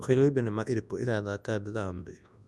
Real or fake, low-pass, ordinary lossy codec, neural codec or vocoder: fake; none; none; codec, 24 kHz, 0.9 kbps, WavTokenizer, large speech release